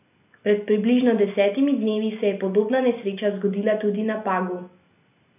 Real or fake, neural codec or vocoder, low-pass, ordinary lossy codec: real; none; 3.6 kHz; none